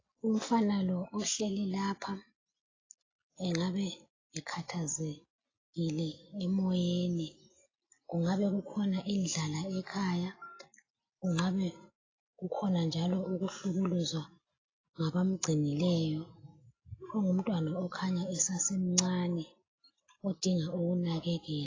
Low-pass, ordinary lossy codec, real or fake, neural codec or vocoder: 7.2 kHz; AAC, 32 kbps; real; none